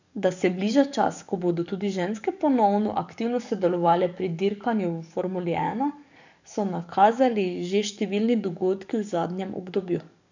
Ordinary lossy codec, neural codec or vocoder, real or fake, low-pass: none; codec, 44.1 kHz, 7.8 kbps, DAC; fake; 7.2 kHz